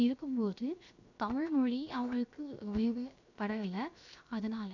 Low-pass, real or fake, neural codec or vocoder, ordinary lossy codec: 7.2 kHz; fake; codec, 16 kHz, 0.7 kbps, FocalCodec; none